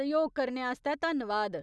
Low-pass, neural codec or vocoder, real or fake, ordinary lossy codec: none; none; real; none